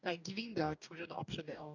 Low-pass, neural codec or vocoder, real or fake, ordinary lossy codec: 7.2 kHz; codec, 44.1 kHz, 2.6 kbps, DAC; fake; none